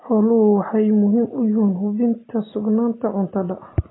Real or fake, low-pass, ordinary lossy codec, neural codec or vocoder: real; 7.2 kHz; AAC, 16 kbps; none